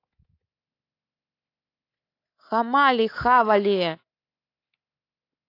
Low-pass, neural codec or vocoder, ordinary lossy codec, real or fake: 5.4 kHz; codec, 24 kHz, 3.1 kbps, DualCodec; AAC, 32 kbps; fake